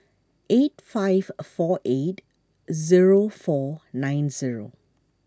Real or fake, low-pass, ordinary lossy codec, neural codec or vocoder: real; none; none; none